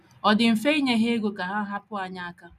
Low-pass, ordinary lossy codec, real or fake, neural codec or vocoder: 14.4 kHz; none; real; none